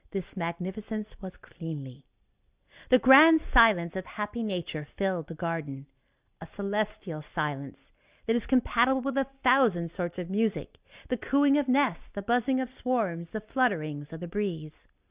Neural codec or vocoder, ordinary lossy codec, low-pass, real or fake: none; Opus, 64 kbps; 3.6 kHz; real